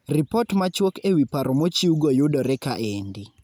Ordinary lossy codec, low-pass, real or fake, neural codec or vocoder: none; none; real; none